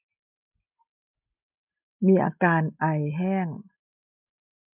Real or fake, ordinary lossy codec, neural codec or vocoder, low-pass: real; none; none; 3.6 kHz